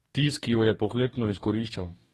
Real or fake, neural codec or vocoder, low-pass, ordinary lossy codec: fake; codec, 44.1 kHz, 2.6 kbps, DAC; 19.8 kHz; AAC, 32 kbps